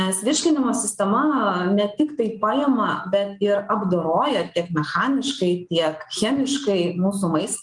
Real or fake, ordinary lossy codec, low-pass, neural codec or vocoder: real; Opus, 32 kbps; 10.8 kHz; none